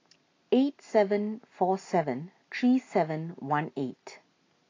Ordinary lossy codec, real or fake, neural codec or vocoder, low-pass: AAC, 32 kbps; real; none; 7.2 kHz